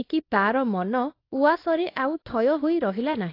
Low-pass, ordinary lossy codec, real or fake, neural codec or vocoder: 5.4 kHz; AAC, 32 kbps; fake; codec, 24 kHz, 0.9 kbps, WavTokenizer, small release